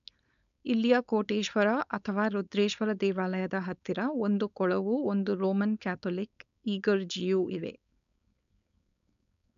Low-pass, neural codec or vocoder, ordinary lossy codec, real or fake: 7.2 kHz; codec, 16 kHz, 4.8 kbps, FACodec; none; fake